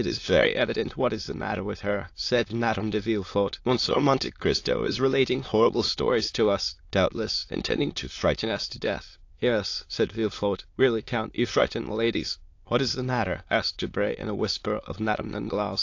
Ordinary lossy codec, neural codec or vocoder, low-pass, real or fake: AAC, 48 kbps; autoencoder, 22.05 kHz, a latent of 192 numbers a frame, VITS, trained on many speakers; 7.2 kHz; fake